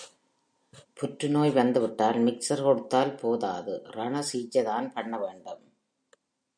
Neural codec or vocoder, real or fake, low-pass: none; real; 9.9 kHz